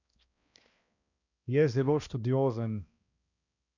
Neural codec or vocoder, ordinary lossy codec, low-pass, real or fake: codec, 16 kHz, 1 kbps, X-Codec, HuBERT features, trained on balanced general audio; none; 7.2 kHz; fake